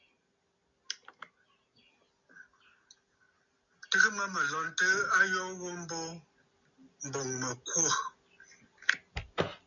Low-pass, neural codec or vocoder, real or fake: 7.2 kHz; none; real